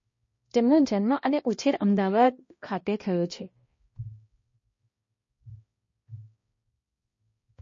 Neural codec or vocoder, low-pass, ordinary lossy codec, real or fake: codec, 16 kHz, 1 kbps, X-Codec, HuBERT features, trained on balanced general audio; 7.2 kHz; MP3, 32 kbps; fake